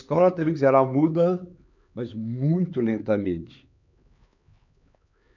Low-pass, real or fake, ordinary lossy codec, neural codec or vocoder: 7.2 kHz; fake; none; codec, 16 kHz, 4 kbps, X-Codec, HuBERT features, trained on LibriSpeech